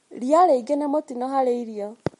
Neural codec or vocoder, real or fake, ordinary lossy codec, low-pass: none; real; MP3, 48 kbps; 19.8 kHz